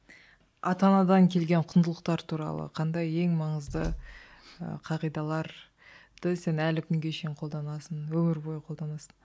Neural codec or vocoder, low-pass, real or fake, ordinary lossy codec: none; none; real; none